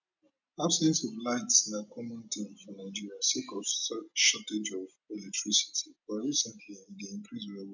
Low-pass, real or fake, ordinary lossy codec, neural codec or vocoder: 7.2 kHz; real; none; none